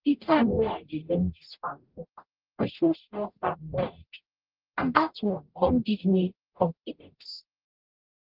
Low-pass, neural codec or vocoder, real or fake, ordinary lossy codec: 5.4 kHz; codec, 44.1 kHz, 0.9 kbps, DAC; fake; Opus, 24 kbps